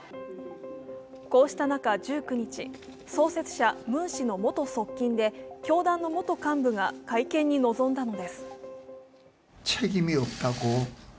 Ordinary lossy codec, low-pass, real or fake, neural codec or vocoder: none; none; real; none